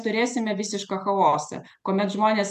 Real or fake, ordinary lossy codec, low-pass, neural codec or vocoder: real; MP3, 96 kbps; 14.4 kHz; none